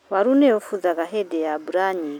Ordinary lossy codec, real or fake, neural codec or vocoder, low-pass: none; real; none; 19.8 kHz